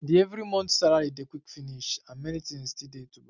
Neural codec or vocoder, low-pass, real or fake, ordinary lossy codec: none; 7.2 kHz; real; none